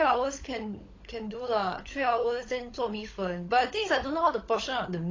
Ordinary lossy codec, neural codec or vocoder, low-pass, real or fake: AAC, 32 kbps; codec, 16 kHz, 16 kbps, FunCodec, trained on LibriTTS, 50 frames a second; 7.2 kHz; fake